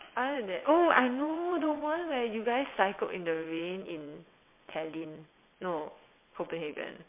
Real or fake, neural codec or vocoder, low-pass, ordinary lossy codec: fake; vocoder, 22.05 kHz, 80 mel bands, WaveNeXt; 3.6 kHz; MP3, 24 kbps